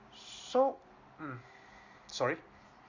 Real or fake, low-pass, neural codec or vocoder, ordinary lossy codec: real; 7.2 kHz; none; Opus, 64 kbps